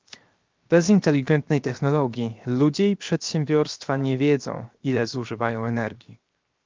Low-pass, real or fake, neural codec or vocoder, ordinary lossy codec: 7.2 kHz; fake; codec, 16 kHz, 0.7 kbps, FocalCodec; Opus, 32 kbps